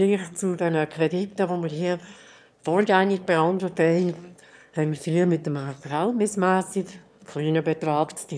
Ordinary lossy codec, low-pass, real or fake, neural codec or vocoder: none; none; fake; autoencoder, 22.05 kHz, a latent of 192 numbers a frame, VITS, trained on one speaker